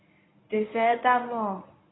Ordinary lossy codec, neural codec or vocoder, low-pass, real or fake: AAC, 16 kbps; codec, 24 kHz, 0.9 kbps, WavTokenizer, medium speech release version 1; 7.2 kHz; fake